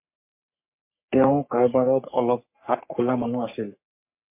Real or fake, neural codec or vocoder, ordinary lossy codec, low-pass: fake; codec, 44.1 kHz, 3.4 kbps, Pupu-Codec; MP3, 24 kbps; 3.6 kHz